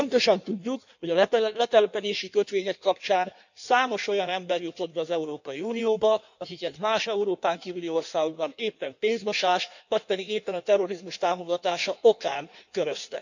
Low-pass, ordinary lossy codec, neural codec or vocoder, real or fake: 7.2 kHz; none; codec, 16 kHz in and 24 kHz out, 1.1 kbps, FireRedTTS-2 codec; fake